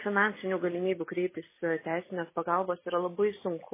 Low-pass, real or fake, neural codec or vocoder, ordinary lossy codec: 3.6 kHz; real; none; MP3, 16 kbps